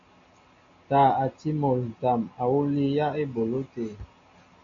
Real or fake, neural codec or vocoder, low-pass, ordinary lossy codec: real; none; 7.2 kHz; AAC, 64 kbps